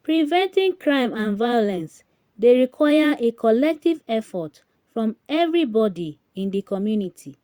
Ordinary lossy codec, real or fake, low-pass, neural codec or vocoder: Opus, 64 kbps; fake; 19.8 kHz; vocoder, 44.1 kHz, 128 mel bands every 512 samples, BigVGAN v2